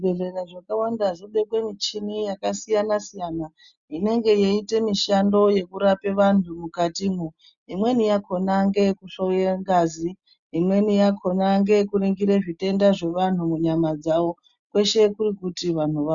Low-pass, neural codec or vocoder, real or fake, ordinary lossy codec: 7.2 kHz; none; real; Opus, 64 kbps